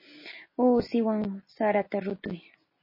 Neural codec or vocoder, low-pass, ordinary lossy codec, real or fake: none; 5.4 kHz; MP3, 24 kbps; real